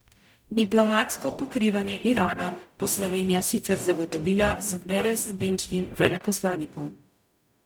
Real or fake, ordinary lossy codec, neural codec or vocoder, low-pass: fake; none; codec, 44.1 kHz, 0.9 kbps, DAC; none